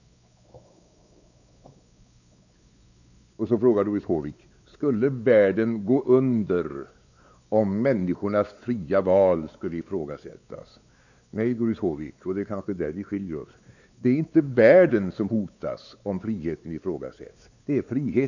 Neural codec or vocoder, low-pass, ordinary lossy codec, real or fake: codec, 16 kHz, 4 kbps, X-Codec, WavLM features, trained on Multilingual LibriSpeech; 7.2 kHz; none; fake